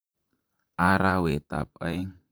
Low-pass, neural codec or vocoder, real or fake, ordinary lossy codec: none; vocoder, 44.1 kHz, 128 mel bands, Pupu-Vocoder; fake; none